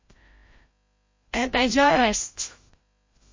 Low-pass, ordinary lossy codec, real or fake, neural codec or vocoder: 7.2 kHz; MP3, 32 kbps; fake; codec, 16 kHz, 0.5 kbps, FreqCodec, larger model